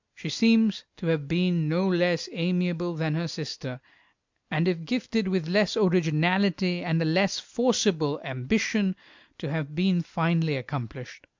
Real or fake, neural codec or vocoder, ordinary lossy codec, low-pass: real; none; MP3, 64 kbps; 7.2 kHz